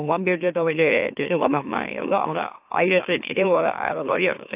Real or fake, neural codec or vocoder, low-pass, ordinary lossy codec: fake; autoencoder, 44.1 kHz, a latent of 192 numbers a frame, MeloTTS; 3.6 kHz; AAC, 32 kbps